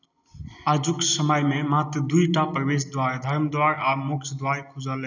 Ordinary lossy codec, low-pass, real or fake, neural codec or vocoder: none; 7.2 kHz; real; none